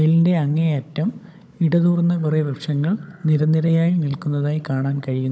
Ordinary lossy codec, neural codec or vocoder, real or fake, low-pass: none; codec, 16 kHz, 16 kbps, FunCodec, trained on Chinese and English, 50 frames a second; fake; none